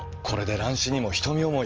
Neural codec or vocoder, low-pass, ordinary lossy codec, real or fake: none; 7.2 kHz; Opus, 24 kbps; real